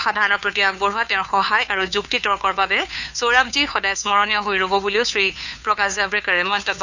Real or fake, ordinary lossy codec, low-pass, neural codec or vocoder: fake; none; 7.2 kHz; codec, 16 kHz, 2 kbps, FunCodec, trained on Chinese and English, 25 frames a second